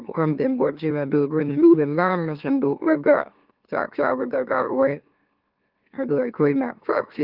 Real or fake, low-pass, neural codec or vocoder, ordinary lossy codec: fake; 5.4 kHz; autoencoder, 44.1 kHz, a latent of 192 numbers a frame, MeloTTS; Opus, 32 kbps